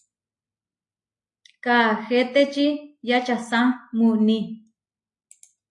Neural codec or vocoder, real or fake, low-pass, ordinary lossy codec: none; real; 10.8 kHz; AAC, 64 kbps